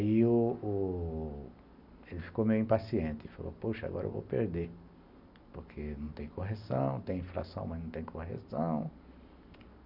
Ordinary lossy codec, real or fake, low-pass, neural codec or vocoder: none; real; 5.4 kHz; none